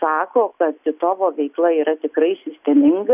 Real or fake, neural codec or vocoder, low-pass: real; none; 3.6 kHz